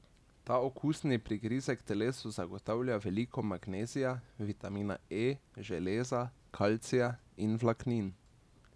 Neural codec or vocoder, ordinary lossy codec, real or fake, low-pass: none; none; real; none